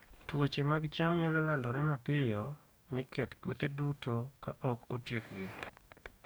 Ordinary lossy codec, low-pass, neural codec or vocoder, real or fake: none; none; codec, 44.1 kHz, 2.6 kbps, DAC; fake